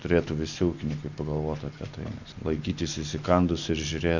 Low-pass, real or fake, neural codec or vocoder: 7.2 kHz; real; none